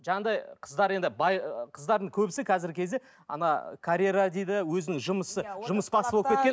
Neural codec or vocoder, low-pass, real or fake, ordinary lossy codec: none; none; real; none